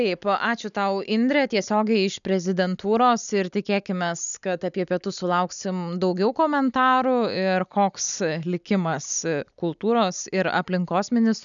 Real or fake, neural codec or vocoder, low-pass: real; none; 7.2 kHz